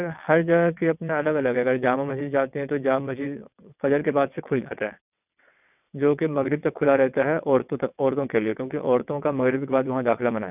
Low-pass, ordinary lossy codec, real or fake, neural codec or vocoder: 3.6 kHz; none; fake; vocoder, 22.05 kHz, 80 mel bands, WaveNeXt